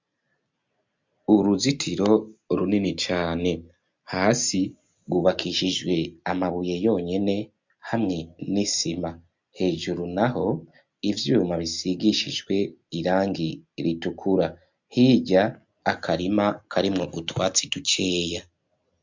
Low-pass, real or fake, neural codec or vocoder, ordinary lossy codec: 7.2 kHz; real; none; MP3, 64 kbps